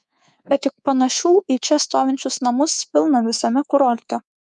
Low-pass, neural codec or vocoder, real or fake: 10.8 kHz; codec, 24 kHz, 3.1 kbps, DualCodec; fake